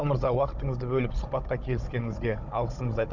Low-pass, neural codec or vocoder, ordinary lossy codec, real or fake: 7.2 kHz; codec, 16 kHz, 16 kbps, FunCodec, trained on Chinese and English, 50 frames a second; none; fake